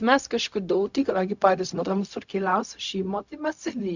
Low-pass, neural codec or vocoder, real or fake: 7.2 kHz; codec, 16 kHz, 0.4 kbps, LongCat-Audio-Codec; fake